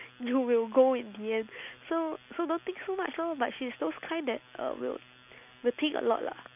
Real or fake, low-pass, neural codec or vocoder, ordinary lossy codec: real; 3.6 kHz; none; none